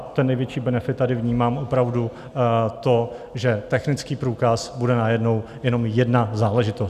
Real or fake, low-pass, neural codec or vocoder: real; 14.4 kHz; none